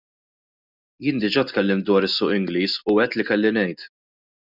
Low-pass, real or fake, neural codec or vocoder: 5.4 kHz; real; none